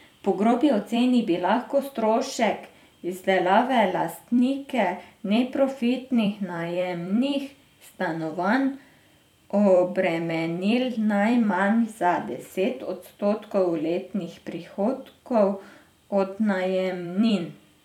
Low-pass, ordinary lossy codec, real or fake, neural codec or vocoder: 19.8 kHz; none; fake; vocoder, 44.1 kHz, 128 mel bands every 256 samples, BigVGAN v2